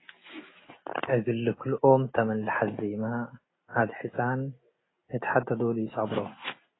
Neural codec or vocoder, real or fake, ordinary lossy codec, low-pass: none; real; AAC, 16 kbps; 7.2 kHz